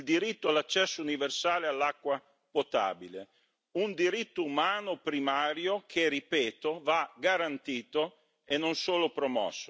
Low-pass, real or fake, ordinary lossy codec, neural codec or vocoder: none; real; none; none